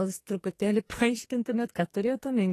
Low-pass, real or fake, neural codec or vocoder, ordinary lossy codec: 14.4 kHz; fake; codec, 44.1 kHz, 2.6 kbps, SNAC; AAC, 48 kbps